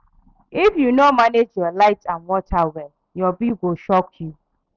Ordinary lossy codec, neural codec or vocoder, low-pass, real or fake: none; none; 7.2 kHz; real